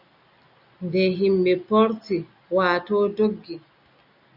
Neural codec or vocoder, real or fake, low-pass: none; real; 5.4 kHz